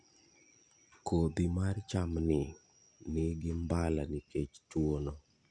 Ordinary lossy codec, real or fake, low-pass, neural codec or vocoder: none; real; 9.9 kHz; none